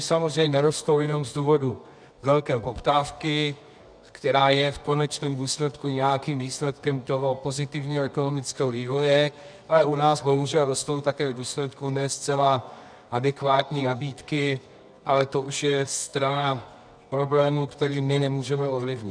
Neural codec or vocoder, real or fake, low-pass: codec, 24 kHz, 0.9 kbps, WavTokenizer, medium music audio release; fake; 9.9 kHz